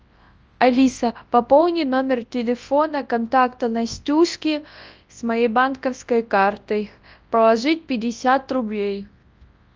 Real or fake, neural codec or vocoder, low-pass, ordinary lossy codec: fake; codec, 24 kHz, 0.9 kbps, WavTokenizer, large speech release; 7.2 kHz; Opus, 24 kbps